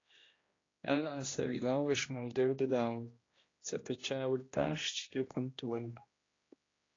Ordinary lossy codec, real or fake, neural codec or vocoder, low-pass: AAC, 32 kbps; fake; codec, 16 kHz, 1 kbps, X-Codec, HuBERT features, trained on general audio; 7.2 kHz